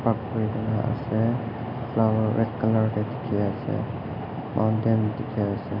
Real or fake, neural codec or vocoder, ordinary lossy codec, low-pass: real; none; none; 5.4 kHz